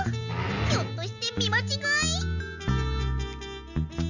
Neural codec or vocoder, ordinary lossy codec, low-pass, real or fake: none; none; 7.2 kHz; real